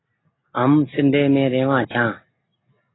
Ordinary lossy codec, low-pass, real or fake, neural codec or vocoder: AAC, 16 kbps; 7.2 kHz; real; none